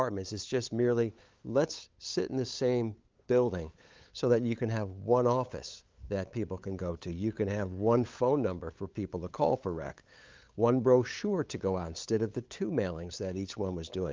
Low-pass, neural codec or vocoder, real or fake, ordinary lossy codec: 7.2 kHz; codec, 16 kHz, 8 kbps, FunCodec, trained on LibriTTS, 25 frames a second; fake; Opus, 32 kbps